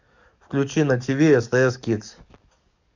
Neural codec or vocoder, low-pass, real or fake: codec, 44.1 kHz, 7.8 kbps, DAC; 7.2 kHz; fake